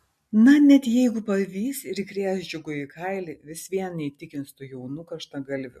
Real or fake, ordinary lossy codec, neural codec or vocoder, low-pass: real; MP3, 64 kbps; none; 14.4 kHz